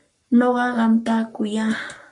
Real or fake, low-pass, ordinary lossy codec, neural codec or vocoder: fake; 10.8 kHz; MP3, 48 kbps; codec, 44.1 kHz, 7.8 kbps, Pupu-Codec